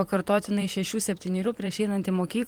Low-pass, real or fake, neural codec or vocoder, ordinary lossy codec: 19.8 kHz; fake; vocoder, 44.1 kHz, 128 mel bands, Pupu-Vocoder; Opus, 24 kbps